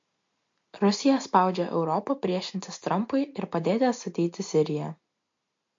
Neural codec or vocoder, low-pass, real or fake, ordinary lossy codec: none; 7.2 kHz; real; MP3, 48 kbps